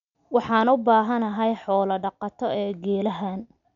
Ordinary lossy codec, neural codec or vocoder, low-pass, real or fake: none; none; 7.2 kHz; real